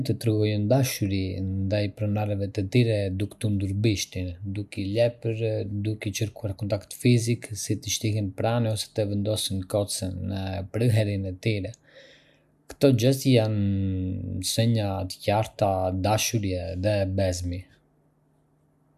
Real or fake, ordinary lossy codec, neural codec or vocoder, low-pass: real; none; none; 14.4 kHz